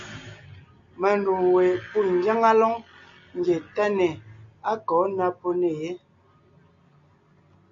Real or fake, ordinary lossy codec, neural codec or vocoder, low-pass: real; MP3, 48 kbps; none; 7.2 kHz